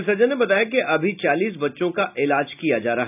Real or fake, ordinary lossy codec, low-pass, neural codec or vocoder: real; none; 3.6 kHz; none